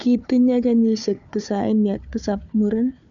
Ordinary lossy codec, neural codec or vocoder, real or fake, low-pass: none; codec, 16 kHz, 4 kbps, FunCodec, trained on Chinese and English, 50 frames a second; fake; 7.2 kHz